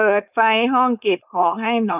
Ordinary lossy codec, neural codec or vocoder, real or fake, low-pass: none; codec, 16 kHz, 4.8 kbps, FACodec; fake; 3.6 kHz